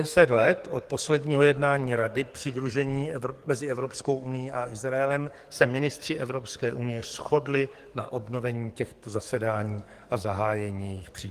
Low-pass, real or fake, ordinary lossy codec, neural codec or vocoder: 14.4 kHz; fake; Opus, 24 kbps; codec, 44.1 kHz, 2.6 kbps, SNAC